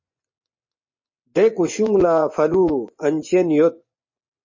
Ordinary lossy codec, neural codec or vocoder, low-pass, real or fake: MP3, 32 kbps; vocoder, 24 kHz, 100 mel bands, Vocos; 7.2 kHz; fake